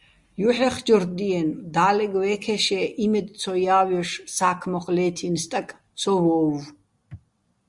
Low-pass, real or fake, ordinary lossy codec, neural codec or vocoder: 10.8 kHz; real; Opus, 64 kbps; none